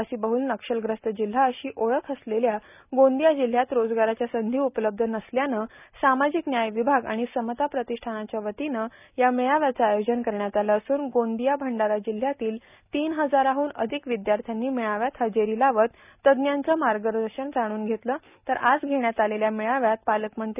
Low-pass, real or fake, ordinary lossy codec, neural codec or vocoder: 3.6 kHz; real; none; none